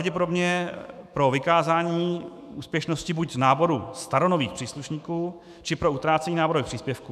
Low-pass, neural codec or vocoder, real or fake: 14.4 kHz; autoencoder, 48 kHz, 128 numbers a frame, DAC-VAE, trained on Japanese speech; fake